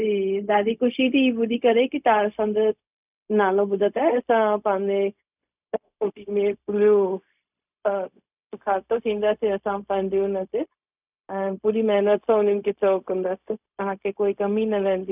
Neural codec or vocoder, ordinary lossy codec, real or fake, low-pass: codec, 16 kHz, 0.4 kbps, LongCat-Audio-Codec; none; fake; 3.6 kHz